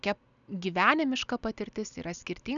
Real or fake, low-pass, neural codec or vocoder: real; 7.2 kHz; none